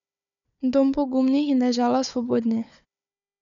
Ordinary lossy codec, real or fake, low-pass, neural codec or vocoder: none; fake; 7.2 kHz; codec, 16 kHz, 4 kbps, FunCodec, trained on Chinese and English, 50 frames a second